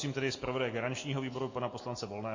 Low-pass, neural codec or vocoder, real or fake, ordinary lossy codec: 7.2 kHz; none; real; MP3, 32 kbps